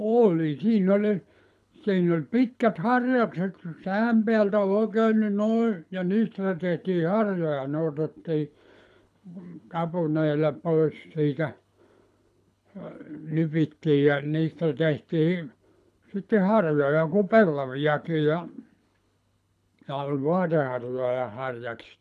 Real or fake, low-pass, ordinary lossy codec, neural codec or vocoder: fake; none; none; codec, 24 kHz, 6 kbps, HILCodec